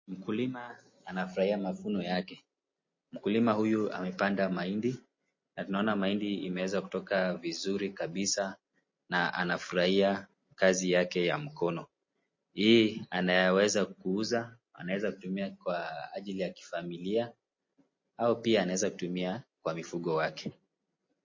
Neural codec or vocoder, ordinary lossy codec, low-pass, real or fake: none; MP3, 32 kbps; 7.2 kHz; real